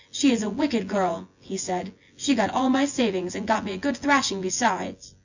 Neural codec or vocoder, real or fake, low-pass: vocoder, 24 kHz, 100 mel bands, Vocos; fake; 7.2 kHz